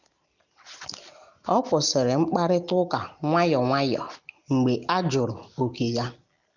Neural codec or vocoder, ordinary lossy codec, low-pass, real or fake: none; none; 7.2 kHz; real